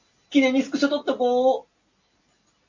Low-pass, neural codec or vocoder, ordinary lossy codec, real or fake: 7.2 kHz; none; AAC, 48 kbps; real